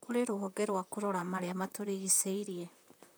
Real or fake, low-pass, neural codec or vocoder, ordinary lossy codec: fake; none; vocoder, 44.1 kHz, 128 mel bands, Pupu-Vocoder; none